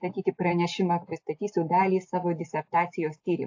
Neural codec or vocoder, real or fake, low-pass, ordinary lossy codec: none; real; 7.2 kHz; AAC, 48 kbps